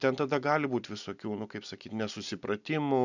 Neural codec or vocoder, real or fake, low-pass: none; real; 7.2 kHz